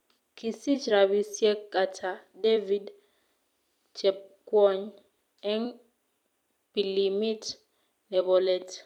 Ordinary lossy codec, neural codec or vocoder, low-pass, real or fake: none; vocoder, 44.1 kHz, 128 mel bands every 256 samples, BigVGAN v2; 19.8 kHz; fake